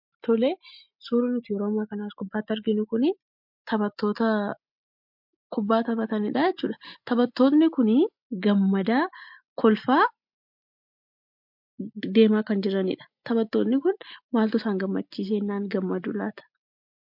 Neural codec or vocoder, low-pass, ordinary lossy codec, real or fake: none; 5.4 kHz; MP3, 48 kbps; real